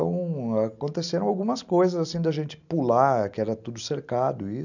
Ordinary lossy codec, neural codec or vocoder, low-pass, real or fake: none; none; 7.2 kHz; real